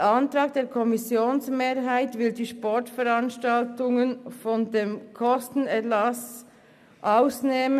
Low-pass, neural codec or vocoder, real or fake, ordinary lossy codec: 14.4 kHz; none; real; none